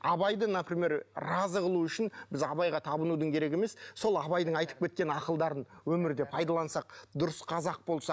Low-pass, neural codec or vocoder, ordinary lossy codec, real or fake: none; none; none; real